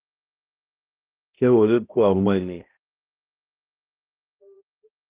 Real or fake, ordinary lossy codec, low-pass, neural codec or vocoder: fake; Opus, 32 kbps; 3.6 kHz; codec, 16 kHz, 0.5 kbps, X-Codec, HuBERT features, trained on balanced general audio